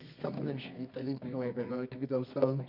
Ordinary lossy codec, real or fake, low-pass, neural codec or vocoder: none; fake; 5.4 kHz; codec, 24 kHz, 0.9 kbps, WavTokenizer, medium music audio release